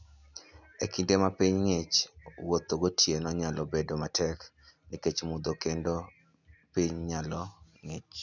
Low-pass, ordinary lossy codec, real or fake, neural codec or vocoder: 7.2 kHz; none; real; none